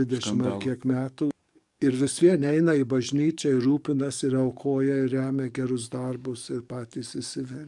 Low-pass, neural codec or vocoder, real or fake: 10.8 kHz; codec, 44.1 kHz, 7.8 kbps, DAC; fake